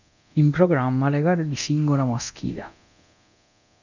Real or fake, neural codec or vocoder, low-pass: fake; codec, 24 kHz, 0.9 kbps, DualCodec; 7.2 kHz